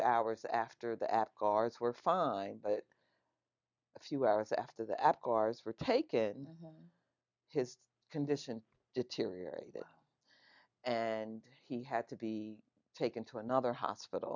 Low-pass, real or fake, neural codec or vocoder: 7.2 kHz; real; none